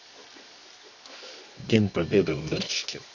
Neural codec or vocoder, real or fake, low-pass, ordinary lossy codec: codec, 24 kHz, 0.9 kbps, WavTokenizer, medium music audio release; fake; 7.2 kHz; none